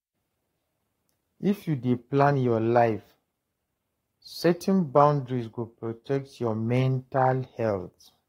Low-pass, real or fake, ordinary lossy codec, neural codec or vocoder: 19.8 kHz; fake; AAC, 48 kbps; codec, 44.1 kHz, 7.8 kbps, Pupu-Codec